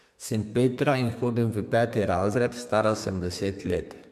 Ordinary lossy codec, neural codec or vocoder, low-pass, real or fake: MP3, 96 kbps; codec, 32 kHz, 1.9 kbps, SNAC; 14.4 kHz; fake